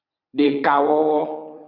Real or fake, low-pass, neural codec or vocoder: fake; 5.4 kHz; vocoder, 22.05 kHz, 80 mel bands, WaveNeXt